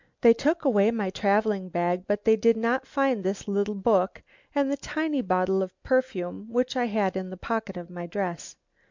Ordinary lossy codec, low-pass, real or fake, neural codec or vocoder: MP3, 64 kbps; 7.2 kHz; real; none